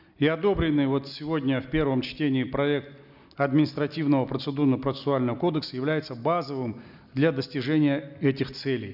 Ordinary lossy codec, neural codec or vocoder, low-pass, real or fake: none; none; 5.4 kHz; real